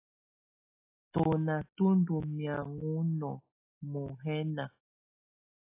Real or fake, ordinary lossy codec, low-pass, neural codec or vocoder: real; AAC, 24 kbps; 3.6 kHz; none